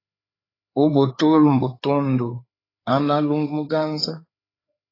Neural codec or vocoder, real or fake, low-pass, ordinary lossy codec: codec, 16 kHz, 4 kbps, FreqCodec, larger model; fake; 5.4 kHz; AAC, 24 kbps